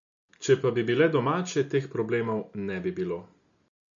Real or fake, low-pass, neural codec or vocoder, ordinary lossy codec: real; 7.2 kHz; none; MP3, 64 kbps